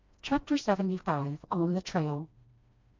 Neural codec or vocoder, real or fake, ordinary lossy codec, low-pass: codec, 16 kHz, 1 kbps, FreqCodec, smaller model; fake; MP3, 48 kbps; 7.2 kHz